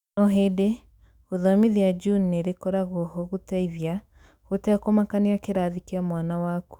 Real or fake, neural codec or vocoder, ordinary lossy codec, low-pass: fake; autoencoder, 48 kHz, 128 numbers a frame, DAC-VAE, trained on Japanese speech; Opus, 64 kbps; 19.8 kHz